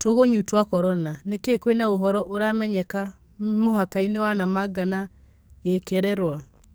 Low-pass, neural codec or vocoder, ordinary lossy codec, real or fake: none; codec, 44.1 kHz, 2.6 kbps, SNAC; none; fake